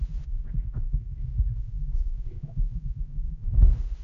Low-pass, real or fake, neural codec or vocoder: 7.2 kHz; fake; codec, 16 kHz, 0.5 kbps, X-Codec, HuBERT features, trained on general audio